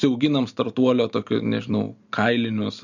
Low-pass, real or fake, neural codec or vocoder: 7.2 kHz; real; none